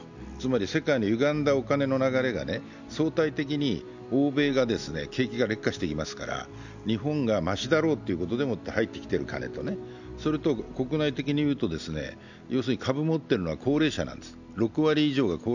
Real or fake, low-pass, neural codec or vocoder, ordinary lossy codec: real; 7.2 kHz; none; none